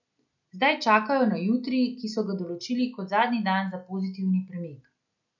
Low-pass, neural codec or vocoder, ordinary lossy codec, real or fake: 7.2 kHz; none; none; real